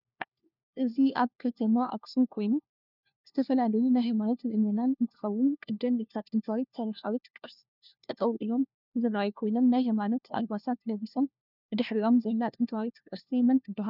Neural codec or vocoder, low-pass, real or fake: codec, 16 kHz, 1 kbps, FunCodec, trained on LibriTTS, 50 frames a second; 5.4 kHz; fake